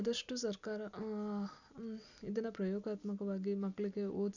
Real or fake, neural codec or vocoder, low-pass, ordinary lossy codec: real; none; 7.2 kHz; none